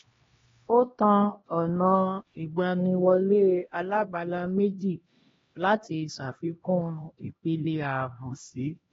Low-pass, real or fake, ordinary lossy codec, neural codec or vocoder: 7.2 kHz; fake; AAC, 24 kbps; codec, 16 kHz, 1 kbps, X-Codec, HuBERT features, trained on LibriSpeech